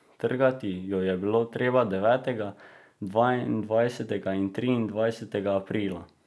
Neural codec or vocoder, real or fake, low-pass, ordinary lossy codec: none; real; none; none